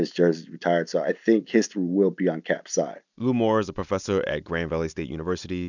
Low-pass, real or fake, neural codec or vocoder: 7.2 kHz; real; none